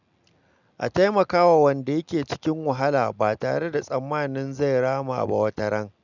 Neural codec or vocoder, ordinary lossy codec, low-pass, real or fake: none; none; 7.2 kHz; real